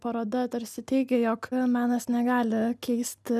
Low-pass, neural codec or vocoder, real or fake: 14.4 kHz; none; real